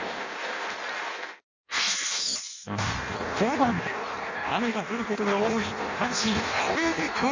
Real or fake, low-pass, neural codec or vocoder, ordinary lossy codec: fake; 7.2 kHz; codec, 16 kHz in and 24 kHz out, 0.6 kbps, FireRedTTS-2 codec; MP3, 64 kbps